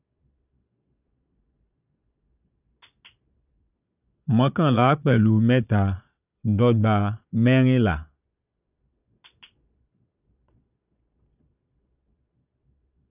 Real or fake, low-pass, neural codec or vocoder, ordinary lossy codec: fake; 3.6 kHz; vocoder, 22.05 kHz, 80 mel bands, Vocos; none